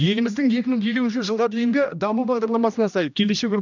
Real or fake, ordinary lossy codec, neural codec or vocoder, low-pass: fake; none; codec, 16 kHz, 1 kbps, X-Codec, HuBERT features, trained on general audio; 7.2 kHz